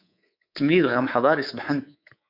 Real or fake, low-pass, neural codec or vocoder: fake; 5.4 kHz; codec, 24 kHz, 3.1 kbps, DualCodec